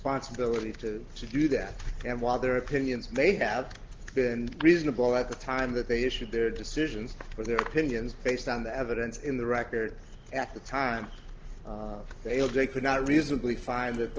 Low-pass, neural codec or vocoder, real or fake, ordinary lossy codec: 7.2 kHz; none; real; Opus, 16 kbps